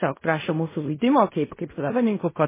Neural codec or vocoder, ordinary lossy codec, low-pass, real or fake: codec, 16 kHz in and 24 kHz out, 0.4 kbps, LongCat-Audio-Codec, fine tuned four codebook decoder; MP3, 16 kbps; 3.6 kHz; fake